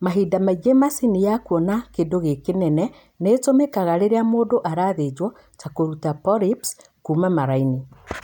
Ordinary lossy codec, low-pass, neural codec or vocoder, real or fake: none; 19.8 kHz; none; real